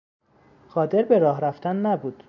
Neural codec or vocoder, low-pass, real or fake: none; 7.2 kHz; real